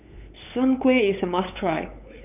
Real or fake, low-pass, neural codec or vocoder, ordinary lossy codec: fake; 3.6 kHz; codec, 16 kHz, 8 kbps, FunCodec, trained on LibriTTS, 25 frames a second; none